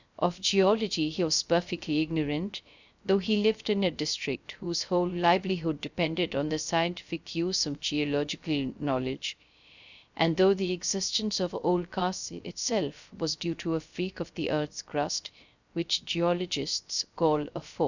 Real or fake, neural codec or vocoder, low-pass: fake; codec, 16 kHz, 0.3 kbps, FocalCodec; 7.2 kHz